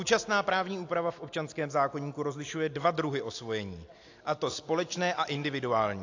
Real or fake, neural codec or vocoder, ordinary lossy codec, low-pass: real; none; AAC, 48 kbps; 7.2 kHz